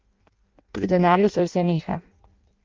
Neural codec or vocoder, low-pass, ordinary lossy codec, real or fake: codec, 16 kHz in and 24 kHz out, 0.6 kbps, FireRedTTS-2 codec; 7.2 kHz; Opus, 32 kbps; fake